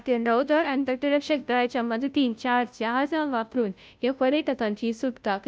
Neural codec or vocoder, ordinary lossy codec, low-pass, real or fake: codec, 16 kHz, 0.5 kbps, FunCodec, trained on Chinese and English, 25 frames a second; none; none; fake